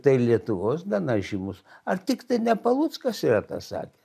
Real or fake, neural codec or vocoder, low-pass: real; none; 14.4 kHz